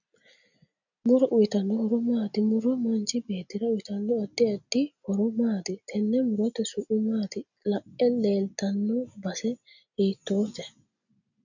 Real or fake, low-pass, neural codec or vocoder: real; 7.2 kHz; none